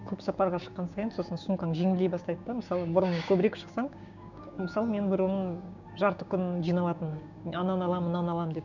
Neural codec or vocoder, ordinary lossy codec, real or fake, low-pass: codec, 16 kHz, 6 kbps, DAC; none; fake; 7.2 kHz